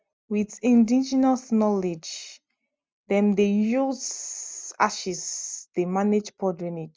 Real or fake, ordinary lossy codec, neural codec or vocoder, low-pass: real; none; none; none